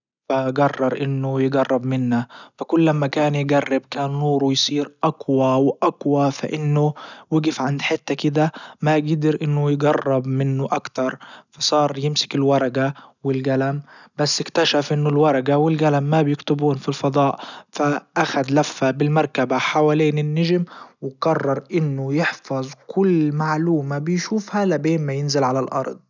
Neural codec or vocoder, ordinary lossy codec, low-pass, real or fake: none; none; 7.2 kHz; real